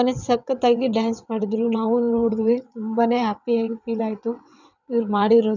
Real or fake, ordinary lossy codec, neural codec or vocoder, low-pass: fake; none; vocoder, 22.05 kHz, 80 mel bands, WaveNeXt; 7.2 kHz